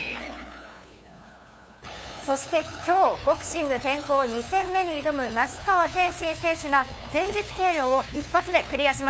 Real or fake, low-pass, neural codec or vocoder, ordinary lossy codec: fake; none; codec, 16 kHz, 2 kbps, FunCodec, trained on LibriTTS, 25 frames a second; none